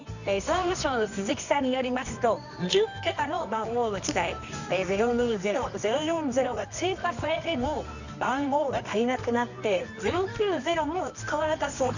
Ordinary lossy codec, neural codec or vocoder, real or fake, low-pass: none; codec, 24 kHz, 0.9 kbps, WavTokenizer, medium music audio release; fake; 7.2 kHz